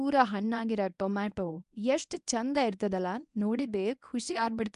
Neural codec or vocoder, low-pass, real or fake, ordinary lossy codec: codec, 24 kHz, 0.9 kbps, WavTokenizer, medium speech release version 1; 10.8 kHz; fake; MP3, 96 kbps